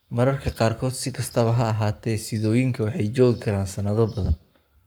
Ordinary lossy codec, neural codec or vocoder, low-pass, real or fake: none; codec, 44.1 kHz, 7.8 kbps, Pupu-Codec; none; fake